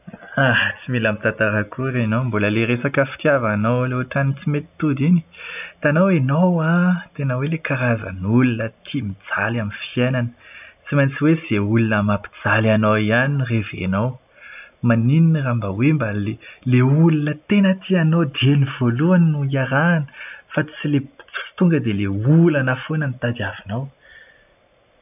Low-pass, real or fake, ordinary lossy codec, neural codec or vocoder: 3.6 kHz; real; none; none